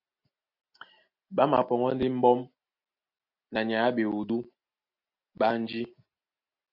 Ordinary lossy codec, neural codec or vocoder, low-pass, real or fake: MP3, 48 kbps; none; 5.4 kHz; real